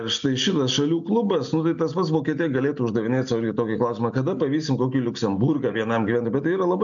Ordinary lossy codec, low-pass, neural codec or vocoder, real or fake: MP3, 64 kbps; 7.2 kHz; none; real